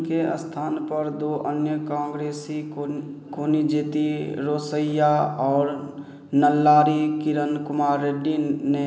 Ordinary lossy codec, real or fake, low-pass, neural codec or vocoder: none; real; none; none